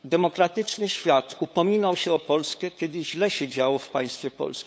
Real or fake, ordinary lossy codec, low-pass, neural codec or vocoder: fake; none; none; codec, 16 kHz, 8 kbps, FunCodec, trained on LibriTTS, 25 frames a second